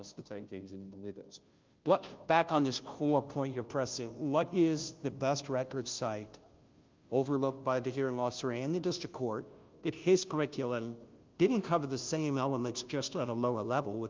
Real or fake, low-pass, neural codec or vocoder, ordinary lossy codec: fake; 7.2 kHz; codec, 16 kHz, 0.5 kbps, FunCodec, trained on Chinese and English, 25 frames a second; Opus, 24 kbps